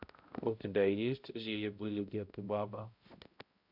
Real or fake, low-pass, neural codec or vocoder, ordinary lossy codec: fake; 5.4 kHz; codec, 16 kHz, 0.5 kbps, X-Codec, HuBERT features, trained on balanced general audio; Opus, 64 kbps